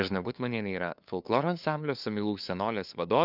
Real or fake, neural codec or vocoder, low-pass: fake; codec, 16 kHz, 2 kbps, FunCodec, trained on LibriTTS, 25 frames a second; 5.4 kHz